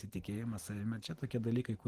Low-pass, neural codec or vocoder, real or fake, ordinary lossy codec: 14.4 kHz; vocoder, 44.1 kHz, 128 mel bands every 512 samples, BigVGAN v2; fake; Opus, 16 kbps